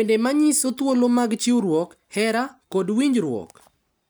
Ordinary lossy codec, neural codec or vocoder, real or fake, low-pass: none; none; real; none